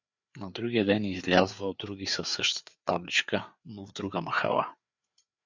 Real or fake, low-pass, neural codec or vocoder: fake; 7.2 kHz; codec, 16 kHz, 4 kbps, FreqCodec, larger model